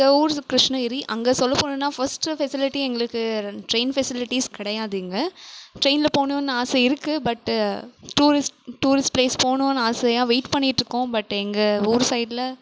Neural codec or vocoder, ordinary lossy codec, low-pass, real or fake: none; none; none; real